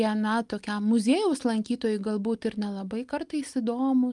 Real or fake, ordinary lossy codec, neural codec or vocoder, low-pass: real; Opus, 32 kbps; none; 10.8 kHz